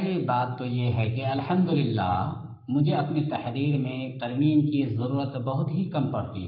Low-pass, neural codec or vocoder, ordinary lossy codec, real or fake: 5.4 kHz; codec, 44.1 kHz, 7.8 kbps, Pupu-Codec; none; fake